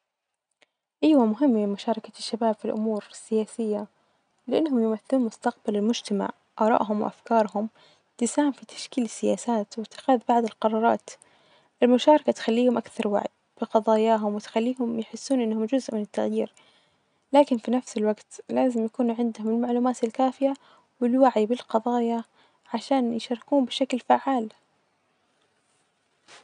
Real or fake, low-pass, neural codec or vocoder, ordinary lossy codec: real; 9.9 kHz; none; none